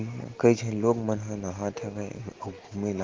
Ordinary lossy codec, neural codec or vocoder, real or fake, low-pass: Opus, 16 kbps; none; real; 7.2 kHz